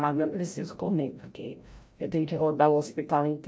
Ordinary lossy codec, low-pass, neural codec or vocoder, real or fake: none; none; codec, 16 kHz, 0.5 kbps, FreqCodec, larger model; fake